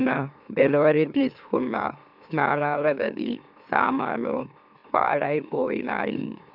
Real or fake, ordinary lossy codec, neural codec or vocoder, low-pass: fake; none; autoencoder, 44.1 kHz, a latent of 192 numbers a frame, MeloTTS; 5.4 kHz